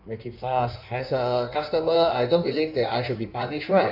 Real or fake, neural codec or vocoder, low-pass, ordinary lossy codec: fake; codec, 16 kHz in and 24 kHz out, 1.1 kbps, FireRedTTS-2 codec; 5.4 kHz; none